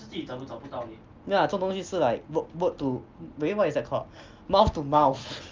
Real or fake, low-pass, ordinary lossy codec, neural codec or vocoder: real; 7.2 kHz; Opus, 24 kbps; none